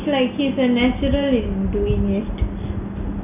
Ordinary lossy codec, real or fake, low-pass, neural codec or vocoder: none; real; 3.6 kHz; none